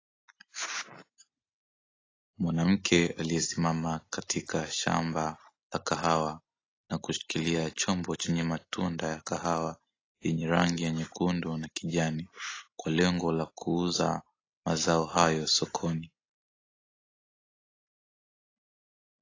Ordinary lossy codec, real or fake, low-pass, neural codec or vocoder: AAC, 32 kbps; real; 7.2 kHz; none